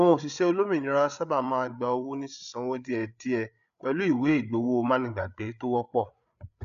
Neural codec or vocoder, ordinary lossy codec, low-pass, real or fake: codec, 16 kHz, 8 kbps, FreqCodec, larger model; none; 7.2 kHz; fake